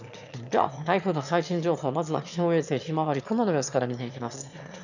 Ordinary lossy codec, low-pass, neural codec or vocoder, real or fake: none; 7.2 kHz; autoencoder, 22.05 kHz, a latent of 192 numbers a frame, VITS, trained on one speaker; fake